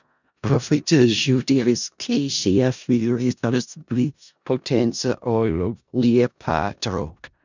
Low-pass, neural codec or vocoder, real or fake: 7.2 kHz; codec, 16 kHz in and 24 kHz out, 0.4 kbps, LongCat-Audio-Codec, four codebook decoder; fake